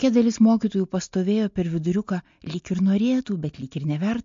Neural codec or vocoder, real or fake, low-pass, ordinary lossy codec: none; real; 7.2 kHz; MP3, 48 kbps